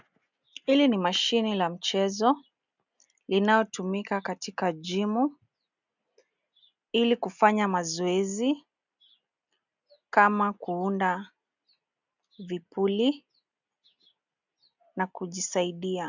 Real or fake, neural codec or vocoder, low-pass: real; none; 7.2 kHz